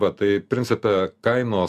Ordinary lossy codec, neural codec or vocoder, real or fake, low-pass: AAC, 96 kbps; none; real; 14.4 kHz